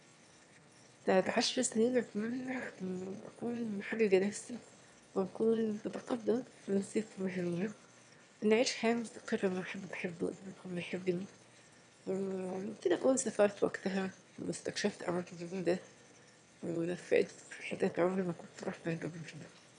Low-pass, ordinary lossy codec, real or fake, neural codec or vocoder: 9.9 kHz; none; fake; autoencoder, 22.05 kHz, a latent of 192 numbers a frame, VITS, trained on one speaker